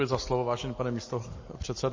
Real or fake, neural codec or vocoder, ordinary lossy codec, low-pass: real; none; MP3, 32 kbps; 7.2 kHz